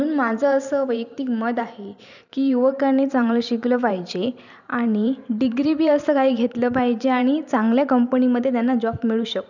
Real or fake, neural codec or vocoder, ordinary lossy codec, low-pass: fake; vocoder, 44.1 kHz, 128 mel bands every 512 samples, BigVGAN v2; none; 7.2 kHz